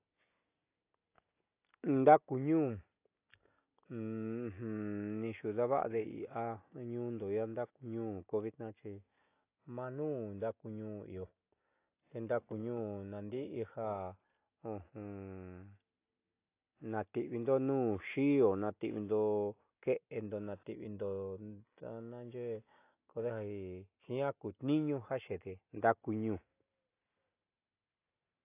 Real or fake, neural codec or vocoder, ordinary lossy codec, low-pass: real; none; AAC, 24 kbps; 3.6 kHz